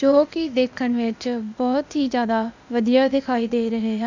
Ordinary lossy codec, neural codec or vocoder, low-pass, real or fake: none; codec, 16 kHz, 0.8 kbps, ZipCodec; 7.2 kHz; fake